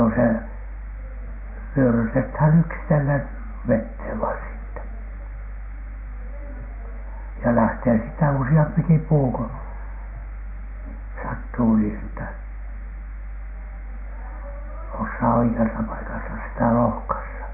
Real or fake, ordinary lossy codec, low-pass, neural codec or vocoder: real; none; 3.6 kHz; none